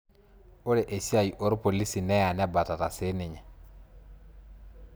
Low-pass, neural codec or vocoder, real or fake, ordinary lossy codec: none; none; real; none